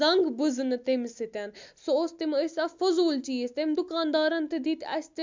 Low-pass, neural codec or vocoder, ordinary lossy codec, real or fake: 7.2 kHz; none; MP3, 64 kbps; real